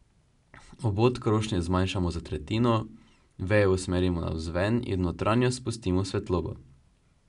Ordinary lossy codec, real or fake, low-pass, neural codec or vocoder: none; real; 10.8 kHz; none